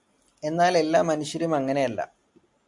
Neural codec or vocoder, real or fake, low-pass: none; real; 10.8 kHz